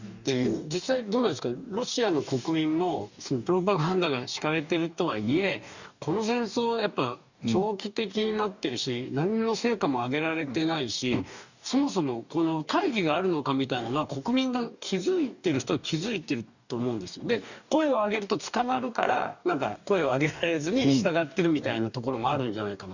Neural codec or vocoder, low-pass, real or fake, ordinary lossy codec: codec, 44.1 kHz, 2.6 kbps, DAC; 7.2 kHz; fake; none